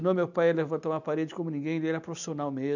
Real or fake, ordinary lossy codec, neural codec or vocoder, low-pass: real; none; none; 7.2 kHz